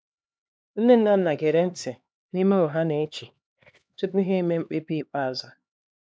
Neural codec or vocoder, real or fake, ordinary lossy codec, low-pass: codec, 16 kHz, 2 kbps, X-Codec, HuBERT features, trained on LibriSpeech; fake; none; none